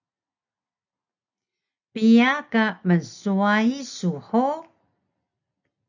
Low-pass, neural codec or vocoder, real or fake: 7.2 kHz; none; real